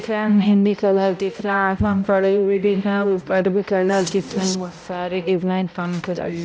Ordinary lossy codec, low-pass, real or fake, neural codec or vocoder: none; none; fake; codec, 16 kHz, 0.5 kbps, X-Codec, HuBERT features, trained on balanced general audio